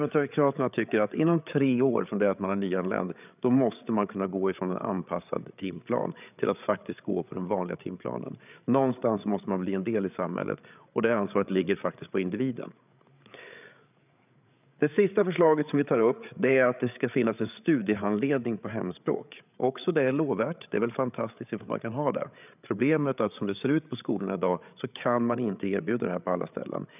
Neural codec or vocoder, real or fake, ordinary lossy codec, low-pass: codec, 16 kHz, 8 kbps, FreqCodec, larger model; fake; none; 3.6 kHz